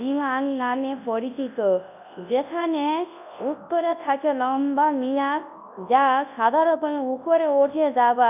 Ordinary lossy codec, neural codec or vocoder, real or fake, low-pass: none; codec, 24 kHz, 0.9 kbps, WavTokenizer, large speech release; fake; 3.6 kHz